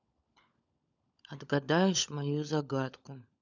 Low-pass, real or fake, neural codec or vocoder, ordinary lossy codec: 7.2 kHz; fake; codec, 16 kHz, 16 kbps, FunCodec, trained on LibriTTS, 50 frames a second; none